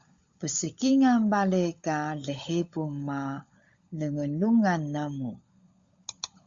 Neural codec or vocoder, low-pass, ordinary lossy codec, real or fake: codec, 16 kHz, 16 kbps, FunCodec, trained on LibriTTS, 50 frames a second; 7.2 kHz; Opus, 64 kbps; fake